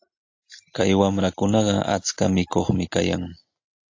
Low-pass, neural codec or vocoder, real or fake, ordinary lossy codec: 7.2 kHz; none; real; AAC, 48 kbps